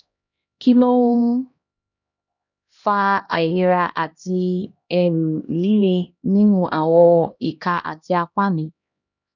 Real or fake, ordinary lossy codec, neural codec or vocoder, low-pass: fake; none; codec, 16 kHz, 1 kbps, X-Codec, HuBERT features, trained on LibriSpeech; 7.2 kHz